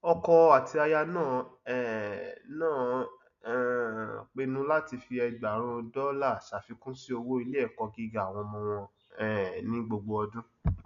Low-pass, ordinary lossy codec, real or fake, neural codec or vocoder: 7.2 kHz; none; real; none